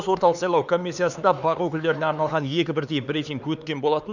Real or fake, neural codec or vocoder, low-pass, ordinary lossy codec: fake; codec, 16 kHz, 4 kbps, X-Codec, HuBERT features, trained on LibriSpeech; 7.2 kHz; none